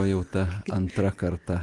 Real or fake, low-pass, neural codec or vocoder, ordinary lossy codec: real; 10.8 kHz; none; Opus, 24 kbps